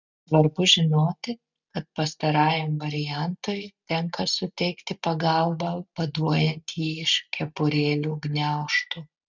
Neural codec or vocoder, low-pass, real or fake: none; 7.2 kHz; real